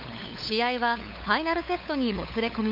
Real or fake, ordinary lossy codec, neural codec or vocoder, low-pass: fake; MP3, 48 kbps; codec, 16 kHz, 8 kbps, FunCodec, trained on LibriTTS, 25 frames a second; 5.4 kHz